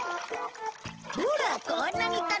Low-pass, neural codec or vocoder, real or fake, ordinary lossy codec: 7.2 kHz; none; real; Opus, 16 kbps